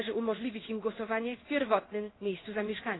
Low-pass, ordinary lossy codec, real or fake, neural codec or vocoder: 7.2 kHz; AAC, 16 kbps; fake; codec, 16 kHz in and 24 kHz out, 1 kbps, XY-Tokenizer